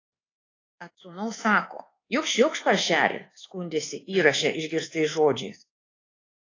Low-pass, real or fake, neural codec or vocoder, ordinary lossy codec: 7.2 kHz; fake; codec, 24 kHz, 1.2 kbps, DualCodec; AAC, 32 kbps